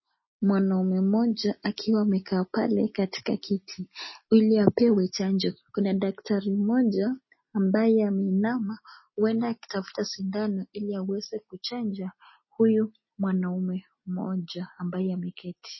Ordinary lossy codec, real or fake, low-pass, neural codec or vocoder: MP3, 24 kbps; real; 7.2 kHz; none